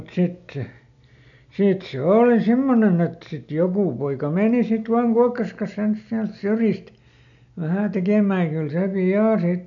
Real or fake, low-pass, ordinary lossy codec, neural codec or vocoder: real; 7.2 kHz; none; none